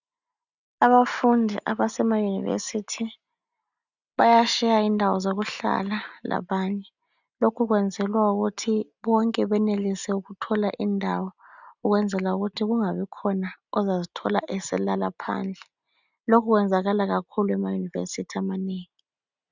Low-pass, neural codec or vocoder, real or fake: 7.2 kHz; none; real